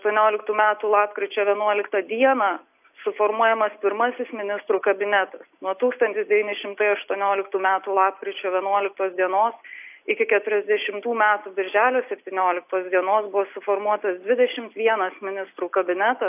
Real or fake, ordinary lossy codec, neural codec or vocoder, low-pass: real; AAC, 32 kbps; none; 3.6 kHz